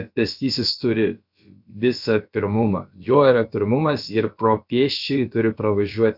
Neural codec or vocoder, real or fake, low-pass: codec, 16 kHz, about 1 kbps, DyCAST, with the encoder's durations; fake; 5.4 kHz